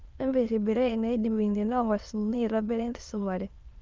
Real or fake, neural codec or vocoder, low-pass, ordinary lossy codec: fake; autoencoder, 22.05 kHz, a latent of 192 numbers a frame, VITS, trained on many speakers; 7.2 kHz; Opus, 24 kbps